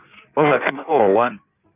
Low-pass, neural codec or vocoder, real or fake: 3.6 kHz; codec, 16 kHz in and 24 kHz out, 1.1 kbps, FireRedTTS-2 codec; fake